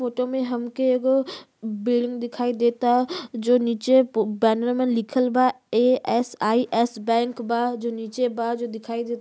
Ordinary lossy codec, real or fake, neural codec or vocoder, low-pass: none; real; none; none